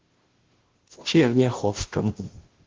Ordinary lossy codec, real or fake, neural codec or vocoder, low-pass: Opus, 16 kbps; fake; codec, 16 kHz, 0.5 kbps, FunCodec, trained on Chinese and English, 25 frames a second; 7.2 kHz